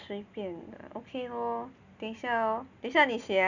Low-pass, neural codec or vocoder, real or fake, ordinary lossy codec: 7.2 kHz; none; real; none